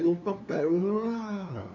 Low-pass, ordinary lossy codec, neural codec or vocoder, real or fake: 7.2 kHz; none; codec, 16 kHz, 2 kbps, FunCodec, trained on LibriTTS, 25 frames a second; fake